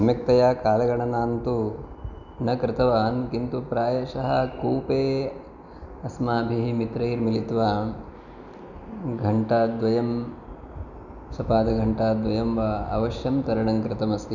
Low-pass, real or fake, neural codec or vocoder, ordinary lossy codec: 7.2 kHz; real; none; none